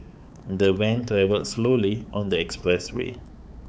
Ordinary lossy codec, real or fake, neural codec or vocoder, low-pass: none; fake; codec, 16 kHz, 4 kbps, X-Codec, HuBERT features, trained on balanced general audio; none